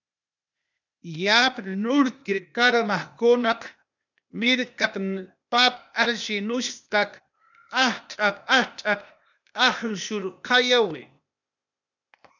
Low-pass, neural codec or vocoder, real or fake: 7.2 kHz; codec, 16 kHz, 0.8 kbps, ZipCodec; fake